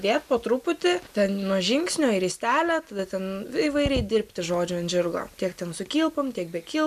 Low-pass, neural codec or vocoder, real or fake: 14.4 kHz; none; real